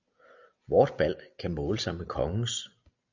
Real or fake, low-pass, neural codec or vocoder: real; 7.2 kHz; none